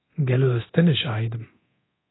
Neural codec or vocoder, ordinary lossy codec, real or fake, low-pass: codec, 24 kHz, 0.9 kbps, DualCodec; AAC, 16 kbps; fake; 7.2 kHz